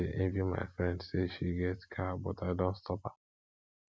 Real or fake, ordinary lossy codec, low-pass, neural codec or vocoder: real; none; none; none